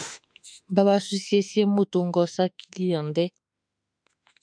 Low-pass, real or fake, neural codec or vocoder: 9.9 kHz; fake; autoencoder, 48 kHz, 32 numbers a frame, DAC-VAE, trained on Japanese speech